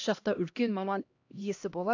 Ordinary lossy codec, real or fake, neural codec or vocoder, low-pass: AAC, 48 kbps; fake; codec, 16 kHz, 1 kbps, X-Codec, HuBERT features, trained on LibriSpeech; 7.2 kHz